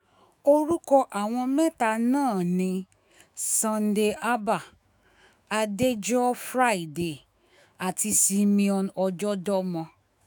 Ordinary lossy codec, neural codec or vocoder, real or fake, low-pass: none; autoencoder, 48 kHz, 128 numbers a frame, DAC-VAE, trained on Japanese speech; fake; none